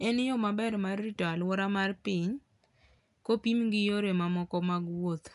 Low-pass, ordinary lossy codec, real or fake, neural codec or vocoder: 10.8 kHz; none; real; none